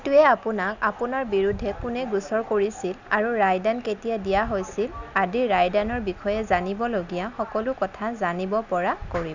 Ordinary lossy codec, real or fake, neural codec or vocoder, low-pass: none; real; none; 7.2 kHz